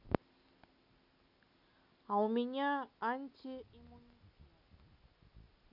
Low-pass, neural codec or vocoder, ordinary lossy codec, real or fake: 5.4 kHz; none; none; real